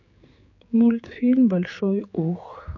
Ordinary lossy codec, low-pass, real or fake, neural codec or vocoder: MP3, 48 kbps; 7.2 kHz; fake; codec, 16 kHz, 4 kbps, X-Codec, HuBERT features, trained on general audio